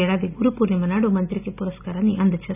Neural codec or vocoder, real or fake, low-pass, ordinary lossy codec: none; real; 3.6 kHz; none